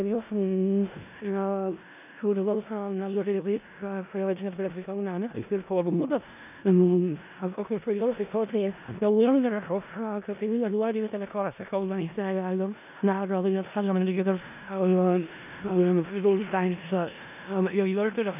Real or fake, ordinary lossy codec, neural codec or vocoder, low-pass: fake; none; codec, 16 kHz in and 24 kHz out, 0.4 kbps, LongCat-Audio-Codec, four codebook decoder; 3.6 kHz